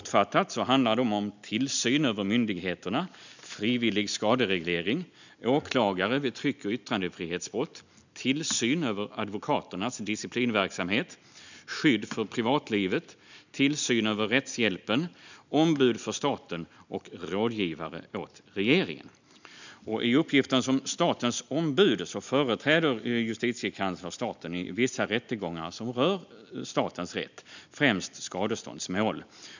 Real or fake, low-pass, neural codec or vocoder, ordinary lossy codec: real; 7.2 kHz; none; none